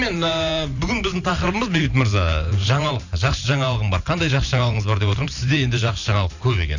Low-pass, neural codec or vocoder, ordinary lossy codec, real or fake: 7.2 kHz; vocoder, 44.1 kHz, 128 mel bands every 512 samples, BigVGAN v2; none; fake